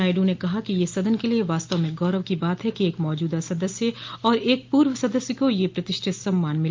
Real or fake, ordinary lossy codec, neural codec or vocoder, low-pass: real; Opus, 32 kbps; none; 7.2 kHz